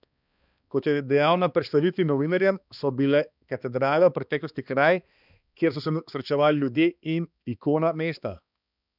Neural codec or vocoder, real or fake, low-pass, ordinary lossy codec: codec, 16 kHz, 2 kbps, X-Codec, HuBERT features, trained on balanced general audio; fake; 5.4 kHz; none